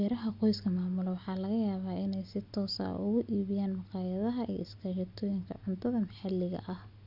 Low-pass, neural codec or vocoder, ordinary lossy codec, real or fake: 5.4 kHz; none; none; real